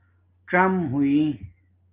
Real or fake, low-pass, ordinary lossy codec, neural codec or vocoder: real; 3.6 kHz; Opus, 32 kbps; none